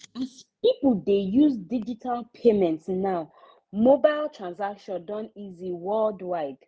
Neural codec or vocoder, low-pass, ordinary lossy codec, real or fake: none; none; none; real